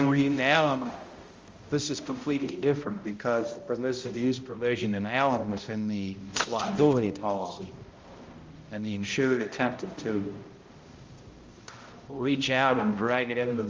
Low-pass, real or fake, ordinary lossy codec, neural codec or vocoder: 7.2 kHz; fake; Opus, 32 kbps; codec, 16 kHz, 0.5 kbps, X-Codec, HuBERT features, trained on balanced general audio